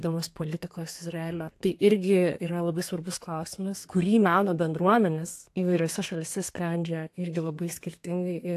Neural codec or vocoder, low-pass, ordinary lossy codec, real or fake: codec, 32 kHz, 1.9 kbps, SNAC; 14.4 kHz; AAC, 64 kbps; fake